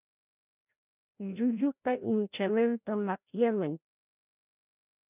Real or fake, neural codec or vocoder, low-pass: fake; codec, 16 kHz, 0.5 kbps, FreqCodec, larger model; 3.6 kHz